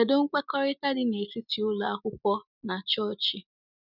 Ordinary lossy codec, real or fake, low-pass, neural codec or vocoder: none; real; 5.4 kHz; none